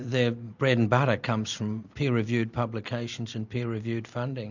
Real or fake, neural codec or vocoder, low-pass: real; none; 7.2 kHz